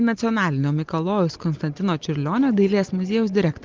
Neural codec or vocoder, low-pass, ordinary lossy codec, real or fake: none; 7.2 kHz; Opus, 32 kbps; real